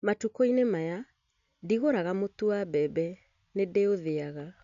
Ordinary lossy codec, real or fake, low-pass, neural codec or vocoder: none; real; 7.2 kHz; none